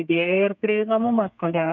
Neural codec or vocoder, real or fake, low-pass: codec, 32 kHz, 1.9 kbps, SNAC; fake; 7.2 kHz